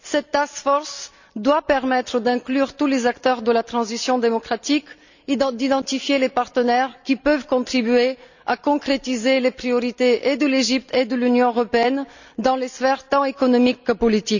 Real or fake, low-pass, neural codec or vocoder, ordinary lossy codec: real; 7.2 kHz; none; none